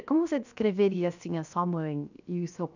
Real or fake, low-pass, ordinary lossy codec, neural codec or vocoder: fake; 7.2 kHz; none; codec, 16 kHz, 0.7 kbps, FocalCodec